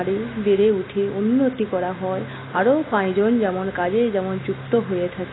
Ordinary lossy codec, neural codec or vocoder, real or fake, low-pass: AAC, 16 kbps; none; real; 7.2 kHz